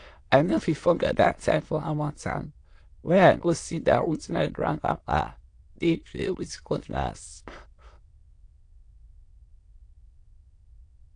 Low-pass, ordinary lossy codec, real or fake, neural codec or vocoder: 9.9 kHz; AAC, 48 kbps; fake; autoencoder, 22.05 kHz, a latent of 192 numbers a frame, VITS, trained on many speakers